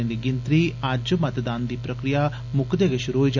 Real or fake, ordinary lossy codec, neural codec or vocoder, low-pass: real; none; none; 7.2 kHz